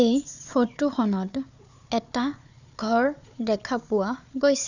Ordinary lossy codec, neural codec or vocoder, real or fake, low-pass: none; codec, 16 kHz, 4 kbps, FunCodec, trained on Chinese and English, 50 frames a second; fake; 7.2 kHz